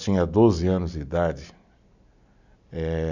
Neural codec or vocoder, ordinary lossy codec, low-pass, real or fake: none; none; 7.2 kHz; real